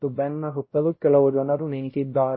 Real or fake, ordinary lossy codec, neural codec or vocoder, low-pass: fake; MP3, 24 kbps; codec, 16 kHz, 0.5 kbps, X-Codec, WavLM features, trained on Multilingual LibriSpeech; 7.2 kHz